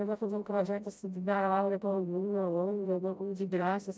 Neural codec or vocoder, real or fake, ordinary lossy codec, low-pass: codec, 16 kHz, 0.5 kbps, FreqCodec, smaller model; fake; none; none